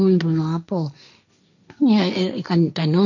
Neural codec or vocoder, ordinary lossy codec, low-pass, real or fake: codec, 16 kHz, 1.1 kbps, Voila-Tokenizer; none; 7.2 kHz; fake